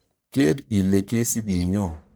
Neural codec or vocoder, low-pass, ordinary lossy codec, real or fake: codec, 44.1 kHz, 1.7 kbps, Pupu-Codec; none; none; fake